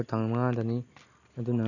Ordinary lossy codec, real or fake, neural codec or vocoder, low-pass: none; real; none; 7.2 kHz